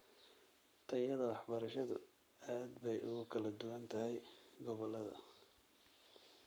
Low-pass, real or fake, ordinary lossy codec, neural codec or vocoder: none; fake; none; codec, 44.1 kHz, 7.8 kbps, Pupu-Codec